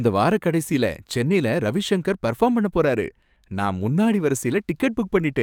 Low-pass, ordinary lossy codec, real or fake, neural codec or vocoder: 19.8 kHz; none; fake; codec, 44.1 kHz, 7.8 kbps, DAC